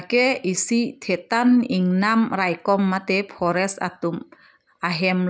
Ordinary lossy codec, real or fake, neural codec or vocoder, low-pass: none; real; none; none